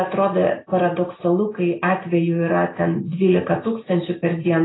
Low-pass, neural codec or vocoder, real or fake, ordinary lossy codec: 7.2 kHz; none; real; AAC, 16 kbps